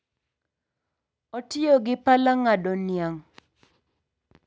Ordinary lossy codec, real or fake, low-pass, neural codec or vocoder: none; real; none; none